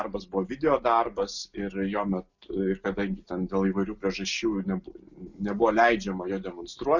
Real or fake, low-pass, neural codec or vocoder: real; 7.2 kHz; none